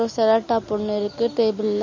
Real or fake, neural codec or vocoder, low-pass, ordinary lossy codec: real; none; 7.2 kHz; MP3, 32 kbps